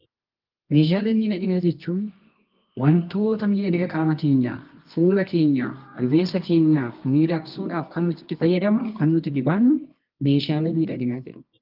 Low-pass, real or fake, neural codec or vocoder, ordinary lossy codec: 5.4 kHz; fake; codec, 24 kHz, 0.9 kbps, WavTokenizer, medium music audio release; Opus, 24 kbps